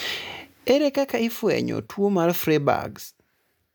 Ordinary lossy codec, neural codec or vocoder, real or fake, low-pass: none; none; real; none